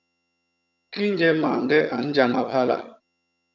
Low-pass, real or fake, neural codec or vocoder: 7.2 kHz; fake; vocoder, 22.05 kHz, 80 mel bands, HiFi-GAN